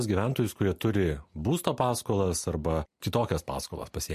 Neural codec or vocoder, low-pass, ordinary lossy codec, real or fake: none; 14.4 kHz; MP3, 64 kbps; real